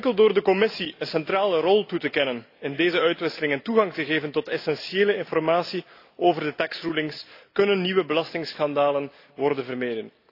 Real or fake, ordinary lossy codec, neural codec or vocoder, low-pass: real; AAC, 32 kbps; none; 5.4 kHz